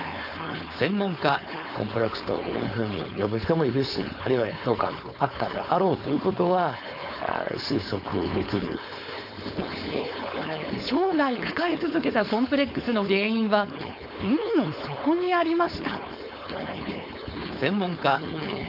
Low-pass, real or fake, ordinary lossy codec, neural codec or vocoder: 5.4 kHz; fake; none; codec, 16 kHz, 4.8 kbps, FACodec